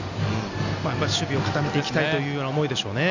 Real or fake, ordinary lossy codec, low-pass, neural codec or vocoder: real; none; 7.2 kHz; none